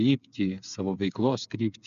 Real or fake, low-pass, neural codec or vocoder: fake; 7.2 kHz; codec, 16 kHz, 8 kbps, FreqCodec, smaller model